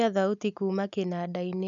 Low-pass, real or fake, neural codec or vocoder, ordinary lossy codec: 7.2 kHz; real; none; none